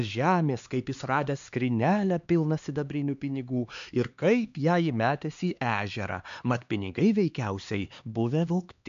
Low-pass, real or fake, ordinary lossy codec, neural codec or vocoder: 7.2 kHz; fake; MP3, 64 kbps; codec, 16 kHz, 2 kbps, X-Codec, HuBERT features, trained on LibriSpeech